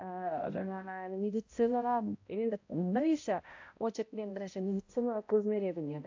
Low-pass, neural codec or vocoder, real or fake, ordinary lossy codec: 7.2 kHz; codec, 16 kHz, 0.5 kbps, X-Codec, HuBERT features, trained on balanced general audio; fake; AAC, 48 kbps